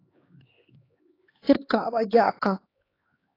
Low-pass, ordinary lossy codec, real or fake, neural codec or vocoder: 5.4 kHz; AAC, 24 kbps; fake; codec, 16 kHz, 2 kbps, X-Codec, HuBERT features, trained on LibriSpeech